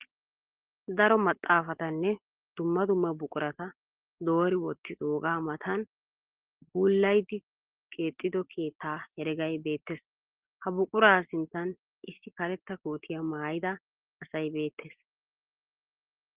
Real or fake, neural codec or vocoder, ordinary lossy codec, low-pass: real; none; Opus, 16 kbps; 3.6 kHz